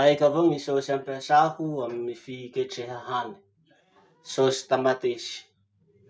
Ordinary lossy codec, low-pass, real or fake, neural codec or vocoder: none; none; real; none